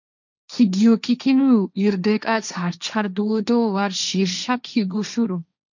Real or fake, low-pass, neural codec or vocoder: fake; 7.2 kHz; codec, 16 kHz, 1.1 kbps, Voila-Tokenizer